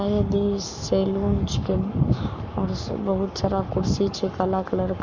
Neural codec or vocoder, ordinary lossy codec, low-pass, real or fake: codec, 44.1 kHz, 7.8 kbps, Pupu-Codec; none; 7.2 kHz; fake